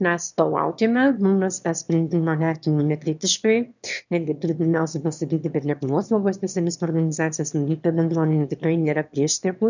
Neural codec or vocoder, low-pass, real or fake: autoencoder, 22.05 kHz, a latent of 192 numbers a frame, VITS, trained on one speaker; 7.2 kHz; fake